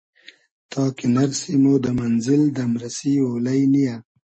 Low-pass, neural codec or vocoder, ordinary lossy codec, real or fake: 9.9 kHz; none; MP3, 32 kbps; real